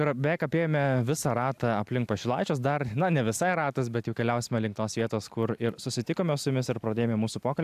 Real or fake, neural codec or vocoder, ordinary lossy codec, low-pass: real; none; AAC, 96 kbps; 14.4 kHz